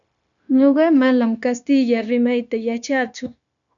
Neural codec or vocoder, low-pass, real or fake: codec, 16 kHz, 0.9 kbps, LongCat-Audio-Codec; 7.2 kHz; fake